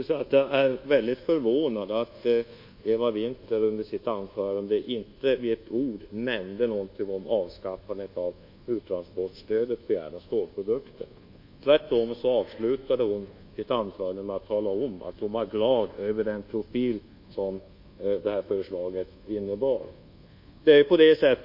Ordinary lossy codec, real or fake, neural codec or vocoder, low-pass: MP3, 32 kbps; fake; codec, 24 kHz, 1.2 kbps, DualCodec; 5.4 kHz